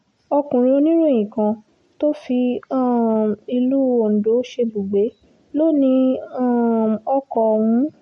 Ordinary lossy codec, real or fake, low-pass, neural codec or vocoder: MP3, 48 kbps; real; 19.8 kHz; none